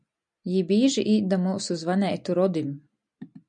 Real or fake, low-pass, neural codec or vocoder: real; 9.9 kHz; none